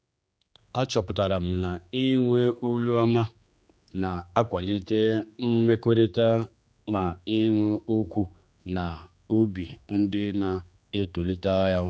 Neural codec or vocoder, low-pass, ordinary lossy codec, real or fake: codec, 16 kHz, 2 kbps, X-Codec, HuBERT features, trained on general audio; none; none; fake